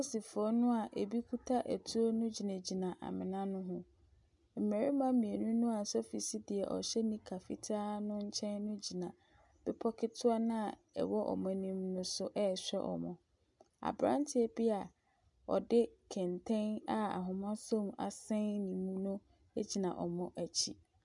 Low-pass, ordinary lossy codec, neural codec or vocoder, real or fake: 10.8 kHz; MP3, 96 kbps; none; real